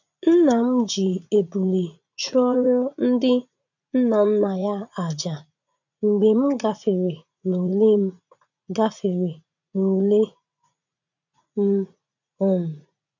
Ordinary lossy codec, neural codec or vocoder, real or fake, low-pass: none; vocoder, 44.1 kHz, 80 mel bands, Vocos; fake; 7.2 kHz